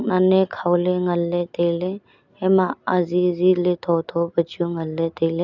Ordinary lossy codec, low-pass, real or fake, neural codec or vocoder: none; 7.2 kHz; real; none